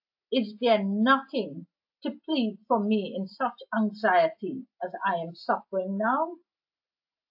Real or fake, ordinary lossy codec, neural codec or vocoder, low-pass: real; AAC, 48 kbps; none; 5.4 kHz